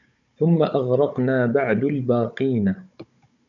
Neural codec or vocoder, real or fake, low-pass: codec, 16 kHz, 16 kbps, FunCodec, trained on Chinese and English, 50 frames a second; fake; 7.2 kHz